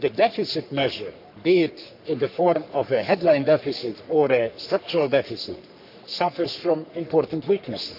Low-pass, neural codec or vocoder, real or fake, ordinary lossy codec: 5.4 kHz; codec, 44.1 kHz, 3.4 kbps, Pupu-Codec; fake; none